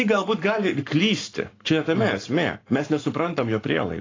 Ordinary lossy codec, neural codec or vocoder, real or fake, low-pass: AAC, 32 kbps; codec, 44.1 kHz, 7.8 kbps, Pupu-Codec; fake; 7.2 kHz